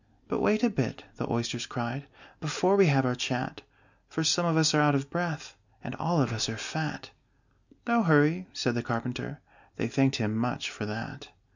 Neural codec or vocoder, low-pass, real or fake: none; 7.2 kHz; real